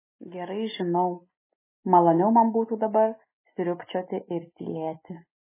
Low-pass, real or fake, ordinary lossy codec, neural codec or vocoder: 3.6 kHz; real; MP3, 16 kbps; none